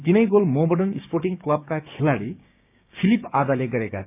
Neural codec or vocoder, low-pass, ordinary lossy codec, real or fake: codec, 16 kHz, 6 kbps, DAC; 3.6 kHz; none; fake